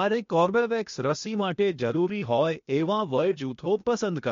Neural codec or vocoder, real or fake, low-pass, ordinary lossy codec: codec, 16 kHz, 0.8 kbps, ZipCodec; fake; 7.2 kHz; MP3, 48 kbps